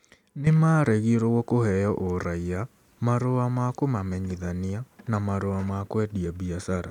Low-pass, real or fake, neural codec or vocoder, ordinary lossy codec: 19.8 kHz; real; none; none